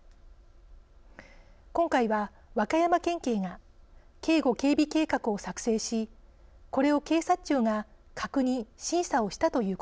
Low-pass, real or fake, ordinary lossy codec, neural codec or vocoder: none; real; none; none